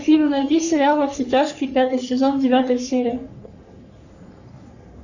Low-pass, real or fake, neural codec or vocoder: 7.2 kHz; fake; codec, 44.1 kHz, 3.4 kbps, Pupu-Codec